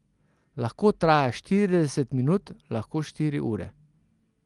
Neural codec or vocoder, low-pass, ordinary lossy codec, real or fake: none; 10.8 kHz; Opus, 24 kbps; real